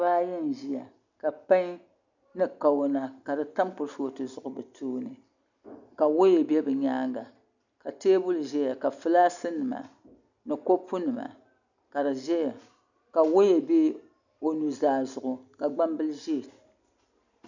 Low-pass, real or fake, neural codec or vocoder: 7.2 kHz; real; none